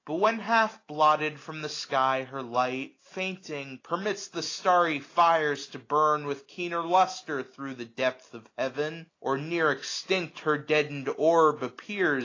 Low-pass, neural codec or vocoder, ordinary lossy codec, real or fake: 7.2 kHz; none; AAC, 32 kbps; real